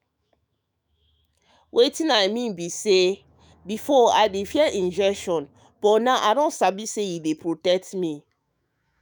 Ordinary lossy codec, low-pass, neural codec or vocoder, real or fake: none; none; autoencoder, 48 kHz, 128 numbers a frame, DAC-VAE, trained on Japanese speech; fake